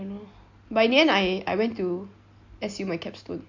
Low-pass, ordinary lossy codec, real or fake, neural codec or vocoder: 7.2 kHz; AAC, 48 kbps; real; none